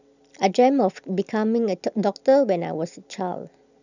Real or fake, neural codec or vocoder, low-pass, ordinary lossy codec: real; none; 7.2 kHz; none